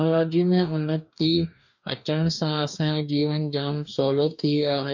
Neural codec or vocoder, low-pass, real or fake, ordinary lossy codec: codec, 44.1 kHz, 2.6 kbps, DAC; 7.2 kHz; fake; none